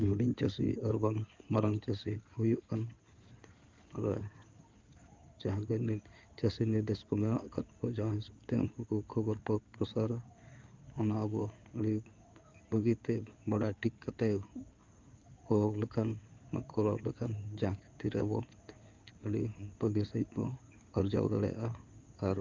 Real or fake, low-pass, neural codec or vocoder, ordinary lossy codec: fake; 7.2 kHz; codec, 16 kHz in and 24 kHz out, 2.2 kbps, FireRedTTS-2 codec; Opus, 32 kbps